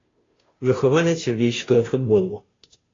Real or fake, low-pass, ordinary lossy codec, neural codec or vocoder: fake; 7.2 kHz; AAC, 32 kbps; codec, 16 kHz, 0.5 kbps, FunCodec, trained on Chinese and English, 25 frames a second